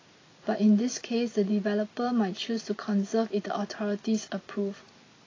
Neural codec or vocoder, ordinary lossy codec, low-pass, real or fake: none; AAC, 32 kbps; 7.2 kHz; real